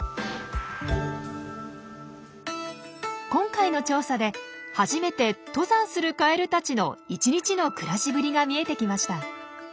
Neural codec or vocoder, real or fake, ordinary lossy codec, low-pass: none; real; none; none